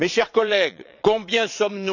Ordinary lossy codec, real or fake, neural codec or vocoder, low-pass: none; fake; vocoder, 22.05 kHz, 80 mel bands, WaveNeXt; 7.2 kHz